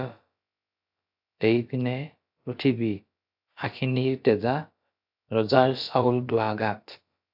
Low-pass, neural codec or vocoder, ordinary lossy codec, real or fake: 5.4 kHz; codec, 16 kHz, about 1 kbps, DyCAST, with the encoder's durations; AAC, 48 kbps; fake